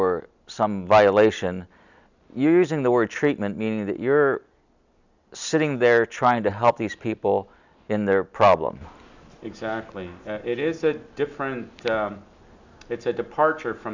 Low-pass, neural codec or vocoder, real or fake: 7.2 kHz; none; real